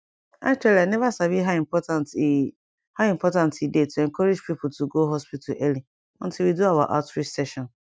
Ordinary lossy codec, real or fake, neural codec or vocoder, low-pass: none; real; none; none